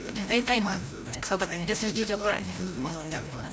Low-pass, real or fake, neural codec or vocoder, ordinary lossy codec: none; fake; codec, 16 kHz, 0.5 kbps, FreqCodec, larger model; none